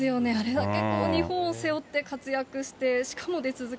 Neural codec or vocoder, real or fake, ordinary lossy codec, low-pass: none; real; none; none